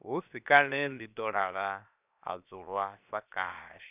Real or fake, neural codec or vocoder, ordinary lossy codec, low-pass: fake; codec, 16 kHz, 0.7 kbps, FocalCodec; none; 3.6 kHz